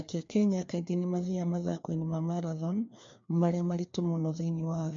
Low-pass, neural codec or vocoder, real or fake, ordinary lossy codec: 7.2 kHz; codec, 16 kHz, 2 kbps, FreqCodec, larger model; fake; MP3, 48 kbps